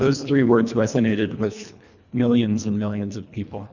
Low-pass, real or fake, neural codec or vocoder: 7.2 kHz; fake; codec, 24 kHz, 1.5 kbps, HILCodec